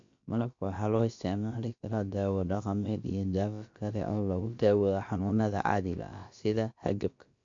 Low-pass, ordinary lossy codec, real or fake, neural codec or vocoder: 7.2 kHz; MP3, 48 kbps; fake; codec, 16 kHz, about 1 kbps, DyCAST, with the encoder's durations